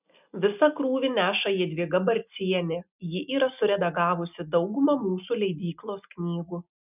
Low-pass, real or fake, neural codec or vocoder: 3.6 kHz; real; none